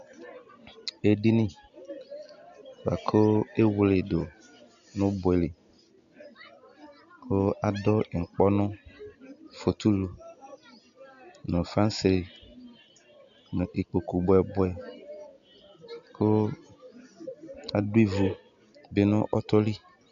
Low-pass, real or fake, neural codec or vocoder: 7.2 kHz; real; none